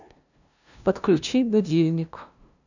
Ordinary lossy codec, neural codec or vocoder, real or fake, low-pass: none; codec, 16 kHz, 0.5 kbps, FunCodec, trained on LibriTTS, 25 frames a second; fake; 7.2 kHz